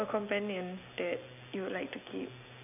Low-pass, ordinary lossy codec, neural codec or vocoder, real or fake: 3.6 kHz; none; none; real